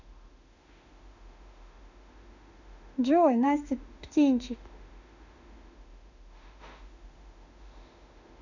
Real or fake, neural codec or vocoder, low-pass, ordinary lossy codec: fake; autoencoder, 48 kHz, 32 numbers a frame, DAC-VAE, trained on Japanese speech; 7.2 kHz; none